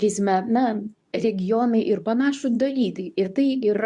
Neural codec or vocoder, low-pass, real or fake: codec, 24 kHz, 0.9 kbps, WavTokenizer, medium speech release version 2; 10.8 kHz; fake